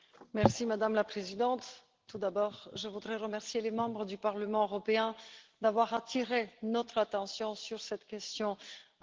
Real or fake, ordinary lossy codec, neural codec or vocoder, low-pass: real; Opus, 16 kbps; none; 7.2 kHz